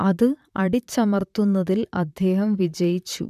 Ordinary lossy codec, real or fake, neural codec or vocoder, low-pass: none; fake; vocoder, 44.1 kHz, 128 mel bands, Pupu-Vocoder; 14.4 kHz